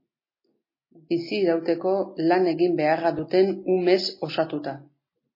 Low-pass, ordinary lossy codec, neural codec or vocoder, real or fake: 5.4 kHz; MP3, 24 kbps; none; real